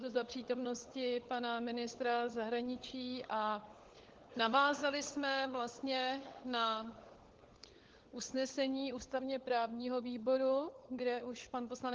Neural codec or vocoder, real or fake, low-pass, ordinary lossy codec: codec, 16 kHz, 16 kbps, FunCodec, trained on LibriTTS, 50 frames a second; fake; 7.2 kHz; Opus, 16 kbps